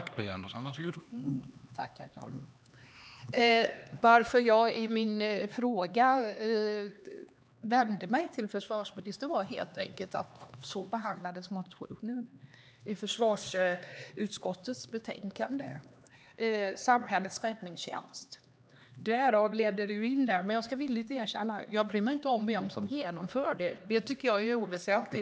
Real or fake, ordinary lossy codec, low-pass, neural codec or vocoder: fake; none; none; codec, 16 kHz, 2 kbps, X-Codec, HuBERT features, trained on LibriSpeech